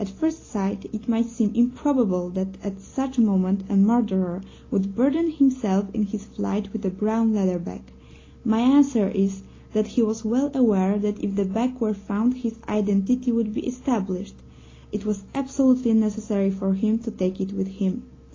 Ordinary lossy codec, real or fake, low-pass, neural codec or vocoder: AAC, 32 kbps; real; 7.2 kHz; none